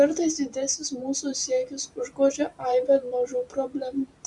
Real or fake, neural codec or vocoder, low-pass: fake; vocoder, 44.1 kHz, 128 mel bands every 512 samples, BigVGAN v2; 10.8 kHz